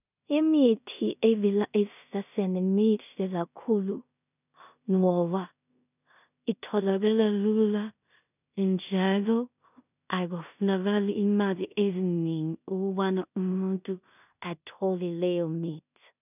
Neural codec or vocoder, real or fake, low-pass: codec, 16 kHz in and 24 kHz out, 0.4 kbps, LongCat-Audio-Codec, two codebook decoder; fake; 3.6 kHz